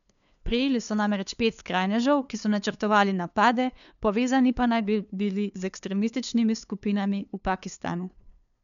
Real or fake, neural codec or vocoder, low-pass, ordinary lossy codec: fake; codec, 16 kHz, 2 kbps, FunCodec, trained on LibriTTS, 25 frames a second; 7.2 kHz; none